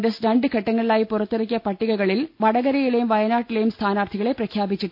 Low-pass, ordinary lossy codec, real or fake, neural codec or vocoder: 5.4 kHz; AAC, 48 kbps; real; none